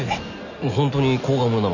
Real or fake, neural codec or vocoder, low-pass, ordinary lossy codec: real; none; 7.2 kHz; none